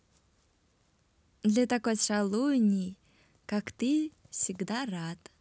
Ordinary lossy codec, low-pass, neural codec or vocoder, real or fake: none; none; none; real